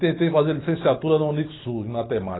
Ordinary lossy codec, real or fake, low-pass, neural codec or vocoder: AAC, 16 kbps; real; 7.2 kHz; none